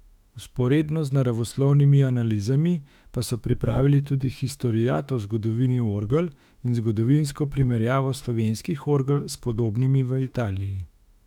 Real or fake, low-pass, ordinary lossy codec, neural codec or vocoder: fake; 19.8 kHz; none; autoencoder, 48 kHz, 32 numbers a frame, DAC-VAE, trained on Japanese speech